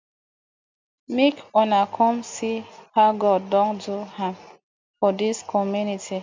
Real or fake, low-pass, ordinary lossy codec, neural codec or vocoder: real; 7.2 kHz; MP3, 64 kbps; none